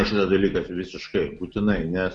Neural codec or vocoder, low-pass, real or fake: none; 10.8 kHz; real